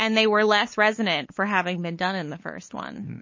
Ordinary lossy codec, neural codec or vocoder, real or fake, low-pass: MP3, 32 kbps; codec, 16 kHz, 8 kbps, FunCodec, trained on LibriTTS, 25 frames a second; fake; 7.2 kHz